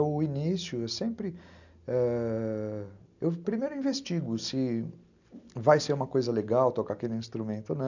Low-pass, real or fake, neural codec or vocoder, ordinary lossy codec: 7.2 kHz; real; none; none